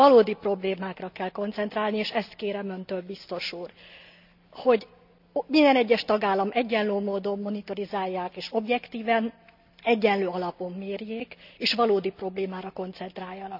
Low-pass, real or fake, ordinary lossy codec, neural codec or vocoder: 5.4 kHz; real; none; none